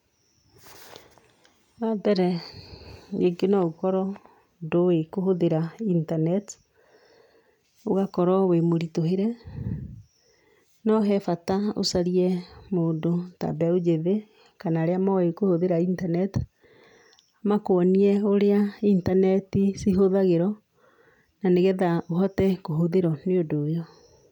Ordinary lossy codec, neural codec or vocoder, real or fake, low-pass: none; none; real; 19.8 kHz